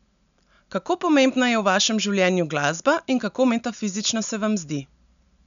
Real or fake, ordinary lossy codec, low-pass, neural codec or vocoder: real; none; 7.2 kHz; none